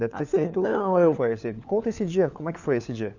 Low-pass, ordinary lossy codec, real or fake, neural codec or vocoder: 7.2 kHz; none; fake; codec, 16 kHz, 4 kbps, FunCodec, trained on LibriTTS, 50 frames a second